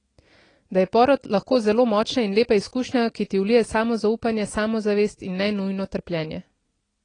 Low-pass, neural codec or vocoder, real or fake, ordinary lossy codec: 9.9 kHz; none; real; AAC, 32 kbps